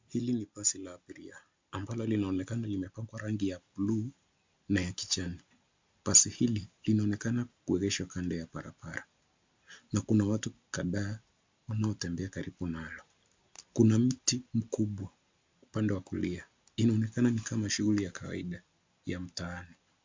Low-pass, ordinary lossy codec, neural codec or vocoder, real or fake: 7.2 kHz; MP3, 64 kbps; none; real